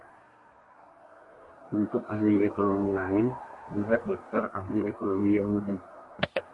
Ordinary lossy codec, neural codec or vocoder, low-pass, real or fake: MP3, 48 kbps; codec, 24 kHz, 1 kbps, SNAC; 10.8 kHz; fake